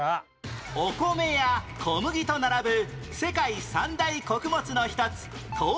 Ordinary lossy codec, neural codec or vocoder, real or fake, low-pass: none; none; real; none